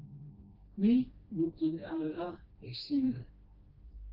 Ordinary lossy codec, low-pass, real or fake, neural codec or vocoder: Opus, 32 kbps; 5.4 kHz; fake; codec, 16 kHz, 1 kbps, FreqCodec, smaller model